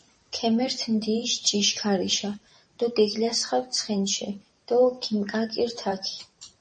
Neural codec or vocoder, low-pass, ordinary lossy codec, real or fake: vocoder, 44.1 kHz, 128 mel bands every 512 samples, BigVGAN v2; 10.8 kHz; MP3, 32 kbps; fake